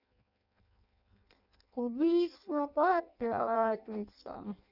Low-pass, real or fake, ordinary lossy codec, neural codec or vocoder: 5.4 kHz; fake; none; codec, 16 kHz in and 24 kHz out, 0.6 kbps, FireRedTTS-2 codec